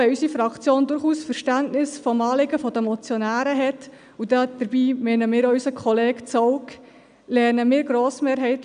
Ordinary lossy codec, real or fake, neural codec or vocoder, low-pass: none; real; none; 9.9 kHz